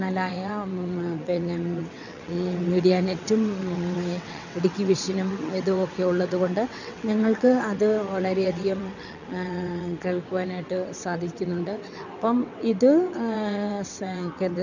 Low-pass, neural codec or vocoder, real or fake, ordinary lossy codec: 7.2 kHz; vocoder, 22.05 kHz, 80 mel bands, WaveNeXt; fake; none